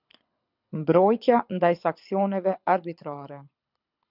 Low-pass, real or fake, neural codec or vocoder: 5.4 kHz; fake; codec, 24 kHz, 6 kbps, HILCodec